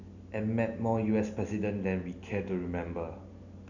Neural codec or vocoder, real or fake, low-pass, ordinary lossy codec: none; real; 7.2 kHz; none